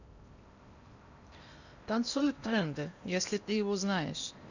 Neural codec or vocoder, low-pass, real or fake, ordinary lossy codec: codec, 16 kHz in and 24 kHz out, 0.8 kbps, FocalCodec, streaming, 65536 codes; 7.2 kHz; fake; none